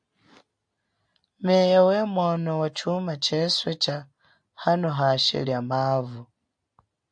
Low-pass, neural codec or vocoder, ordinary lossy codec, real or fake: 9.9 kHz; none; AAC, 64 kbps; real